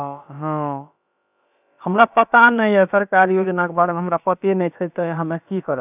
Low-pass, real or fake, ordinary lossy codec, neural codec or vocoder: 3.6 kHz; fake; none; codec, 16 kHz, about 1 kbps, DyCAST, with the encoder's durations